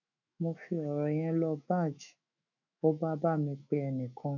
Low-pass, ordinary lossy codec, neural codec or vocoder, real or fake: 7.2 kHz; none; autoencoder, 48 kHz, 128 numbers a frame, DAC-VAE, trained on Japanese speech; fake